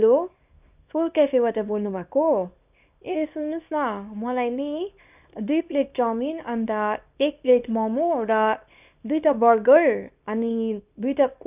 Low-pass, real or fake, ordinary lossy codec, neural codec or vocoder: 3.6 kHz; fake; none; codec, 24 kHz, 0.9 kbps, WavTokenizer, small release